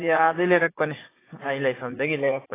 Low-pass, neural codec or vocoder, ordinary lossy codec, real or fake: 3.6 kHz; vocoder, 44.1 kHz, 80 mel bands, Vocos; AAC, 16 kbps; fake